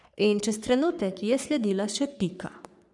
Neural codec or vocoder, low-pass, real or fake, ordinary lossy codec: codec, 44.1 kHz, 3.4 kbps, Pupu-Codec; 10.8 kHz; fake; none